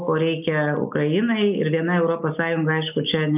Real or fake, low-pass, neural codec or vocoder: real; 3.6 kHz; none